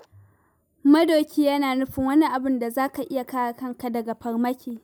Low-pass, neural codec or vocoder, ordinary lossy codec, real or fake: none; none; none; real